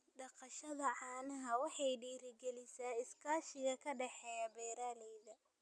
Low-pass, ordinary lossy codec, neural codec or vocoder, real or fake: none; none; none; real